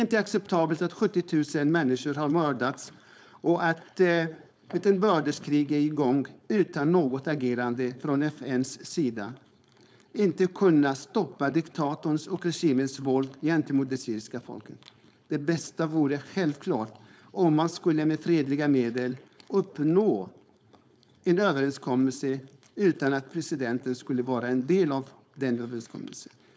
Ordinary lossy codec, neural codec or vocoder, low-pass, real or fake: none; codec, 16 kHz, 4.8 kbps, FACodec; none; fake